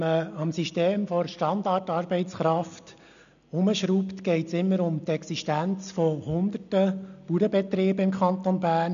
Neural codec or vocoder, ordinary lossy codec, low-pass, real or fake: none; none; 7.2 kHz; real